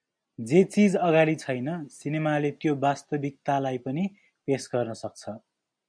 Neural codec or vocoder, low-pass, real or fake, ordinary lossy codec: none; 9.9 kHz; real; MP3, 96 kbps